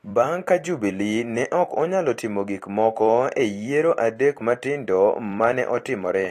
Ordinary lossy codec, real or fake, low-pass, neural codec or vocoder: AAC, 48 kbps; fake; 14.4 kHz; vocoder, 44.1 kHz, 128 mel bands every 256 samples, BigVGAN v2